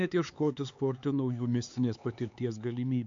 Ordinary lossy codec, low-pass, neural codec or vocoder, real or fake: AAC, 64 kbps; 7.2 kHz; codec, 16 kHz, 4 kbps, X-Codec, HuBERT features, trained on LibriSpeech; fake